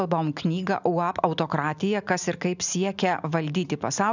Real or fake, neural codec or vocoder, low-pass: real; none; 7.2 kHz